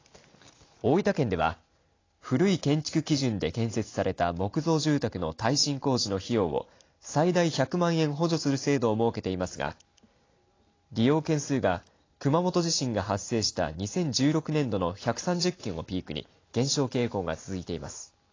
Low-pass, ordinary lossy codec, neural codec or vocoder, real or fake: 7.2 kHz; AAC, 32 kbps; none; real